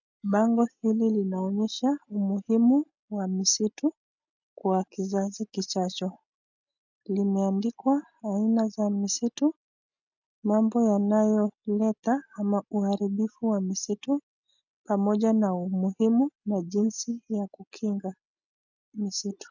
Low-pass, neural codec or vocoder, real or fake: 7.2 kHz; none; real